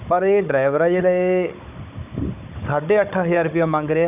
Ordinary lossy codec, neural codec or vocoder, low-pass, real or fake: none; codec, 16 kHz, 16 kbps, FunCodec, trained on Chinese and English, 50 frames a second; 3.6 kHz; fake